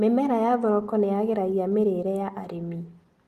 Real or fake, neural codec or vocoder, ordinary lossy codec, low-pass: real; none; Opus, 24 kbps; 14.4 kHz